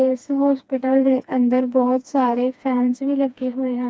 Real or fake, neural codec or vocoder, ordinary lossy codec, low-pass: fake; codec, 16 kHz, 2 kbps, FreqCodec, smaller model; none; none